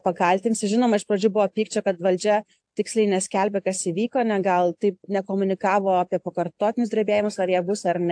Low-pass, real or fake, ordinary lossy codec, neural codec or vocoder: 9.9 kHz; real; AAC, 64 kbps; none